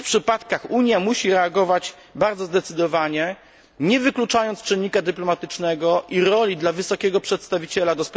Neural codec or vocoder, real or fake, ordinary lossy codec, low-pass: none; real; none; none